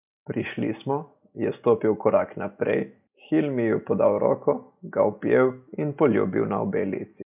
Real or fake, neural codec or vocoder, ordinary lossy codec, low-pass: real; none; none; 3.6 kHz